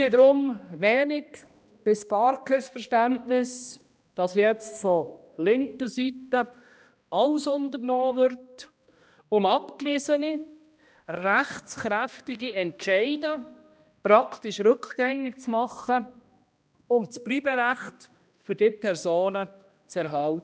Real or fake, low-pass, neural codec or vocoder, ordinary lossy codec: fake; none; codec, 16 kHz, 1 kbps, X-Codec, HuBERT features, trained on balanced general audio; none